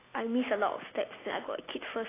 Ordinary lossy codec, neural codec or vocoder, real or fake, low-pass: AAC, 16 kbps; none; real; 3.6 kHz